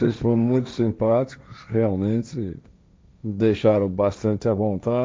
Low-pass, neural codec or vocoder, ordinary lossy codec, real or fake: none; codec, 16 kHz, 1.1 kbps, Voila-Tokenizer; none; fake